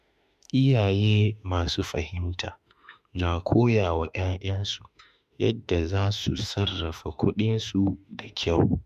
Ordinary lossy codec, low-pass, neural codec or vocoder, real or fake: none; 14.4 kHz; autoencoder, 48 kHz, 32 numbers a frame, DAC-VAE, trained on Japanese speech; fake